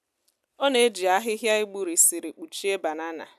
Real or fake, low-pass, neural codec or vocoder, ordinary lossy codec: real; 14.4 kHz; none; none